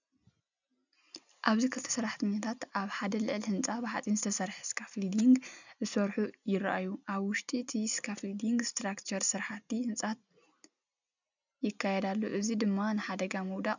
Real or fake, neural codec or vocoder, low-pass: real; none; 7.2 kHz